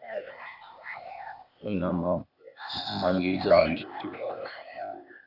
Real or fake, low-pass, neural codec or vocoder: fake; 5.4 kHz; codec, 16 kHz, 0.8 kbps, ZipCodec